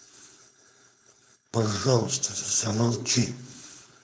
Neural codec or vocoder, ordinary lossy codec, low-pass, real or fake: codec, 16 kHz, 4.8 kbps, FACodec; none; none; fake